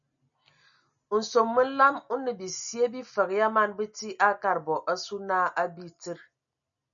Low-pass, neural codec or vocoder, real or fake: 7.2 kHz; none; real